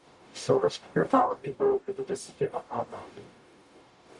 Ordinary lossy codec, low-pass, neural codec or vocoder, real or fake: MP3, 96 kbps; 10.8 kHz; codec, 44.1 kHz, 0.9 kbps, DAC; fake